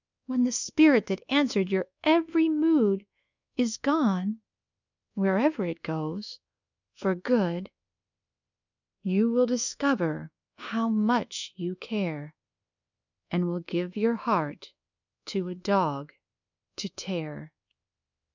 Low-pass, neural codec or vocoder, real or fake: 7.2 kHz; codec, 24 kHz, 1.2 kbps, DualCodec; fake